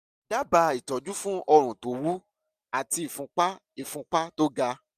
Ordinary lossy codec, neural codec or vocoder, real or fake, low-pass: none; none; real; 14.4 kHz